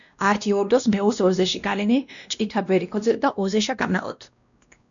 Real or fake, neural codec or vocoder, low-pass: fake; codec, 16 kHz, 1 kbps, X-Codec, WavLM features, trained on Multilingual LibriSpeech; 7.2 kHz